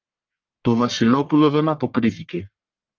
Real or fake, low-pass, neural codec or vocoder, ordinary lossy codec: fake; 7.2 kHz; codec, 44.1 kHz, 1.7 kbps, Pupu-Codec; Opus, 24 kbps